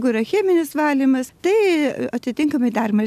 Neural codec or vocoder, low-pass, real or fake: none; 14.4 kHz; real